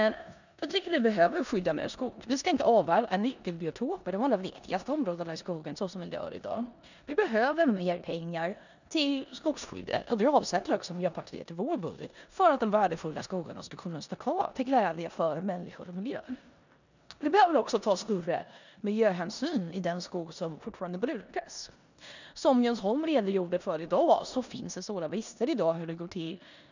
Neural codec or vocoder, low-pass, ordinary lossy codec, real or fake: codec, 16 kHz in and 24 kHz out, 0.9 kbps, LongCat-Audio-Codec, four codebook decoder; 7.2 kHz; none; fake